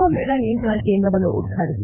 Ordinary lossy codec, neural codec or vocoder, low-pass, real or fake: none; codec, 16 kHz, 2 kbps, FreqCodec, larger model; 3.6 kHz; fake